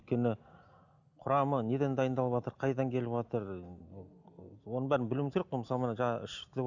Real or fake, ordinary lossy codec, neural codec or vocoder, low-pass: real; none; none; 7.2 kHz